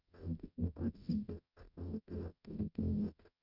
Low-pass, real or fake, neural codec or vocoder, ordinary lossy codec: 5.4 kHz; fake; codec, 44.1 kHz, 0.9 kbps, DAC; none